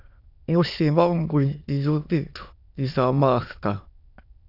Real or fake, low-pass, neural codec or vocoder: fake; 5.4 kHz; autoencoder, 22.05 kHz, a latent of 192 numbers a frame, VITS, trained on many speakers